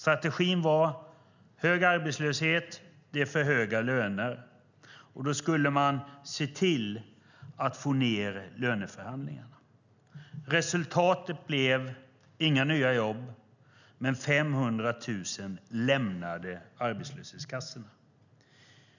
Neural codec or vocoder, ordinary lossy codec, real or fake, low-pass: none; none; real; 7.2 kHz